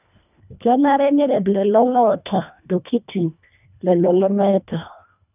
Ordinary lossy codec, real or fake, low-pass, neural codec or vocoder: none; fake; 3.6 kHz; codec, 24 kHz, 1.5 kbps, HILCodec